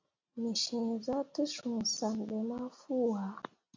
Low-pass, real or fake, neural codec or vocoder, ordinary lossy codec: 7.2 kHz; real; none; MP3, 48 kbps